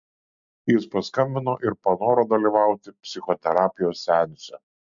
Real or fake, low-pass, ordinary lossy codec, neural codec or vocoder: real; 7.2 kHz; MP3, 64 kbps; none